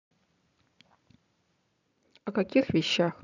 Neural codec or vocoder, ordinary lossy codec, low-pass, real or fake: none; none; 7.2 kHz; real